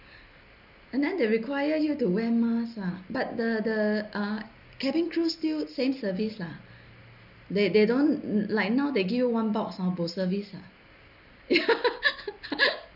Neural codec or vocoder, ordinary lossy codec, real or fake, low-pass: none; none; real; 5.4 kHz